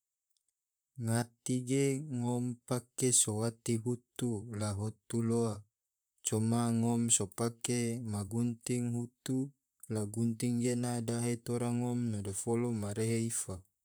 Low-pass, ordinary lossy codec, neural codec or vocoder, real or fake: none; none; vocoder, 44.1 kHz, 128 mel bands, Pupu-Vocoder; fake